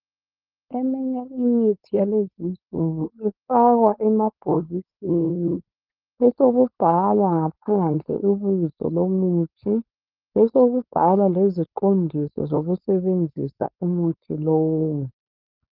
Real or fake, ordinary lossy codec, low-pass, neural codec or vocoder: fake; Opus, 32 kbps; 5.4 kHz; codec, 16 kHz, 4.8 kbps, FACodec